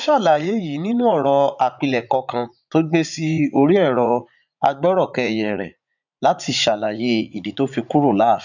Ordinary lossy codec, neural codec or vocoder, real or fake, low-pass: none; vocoder, 44.1 kHz, 80 mel bands, Vocos; fake; 7.2 kHz